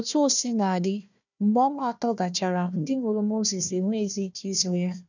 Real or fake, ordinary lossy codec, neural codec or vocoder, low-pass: fake; none; codec, 16 kHz, 1 kbps, FunCodec, trained on Chinese and English, 50 frames a second; 7.2 kHz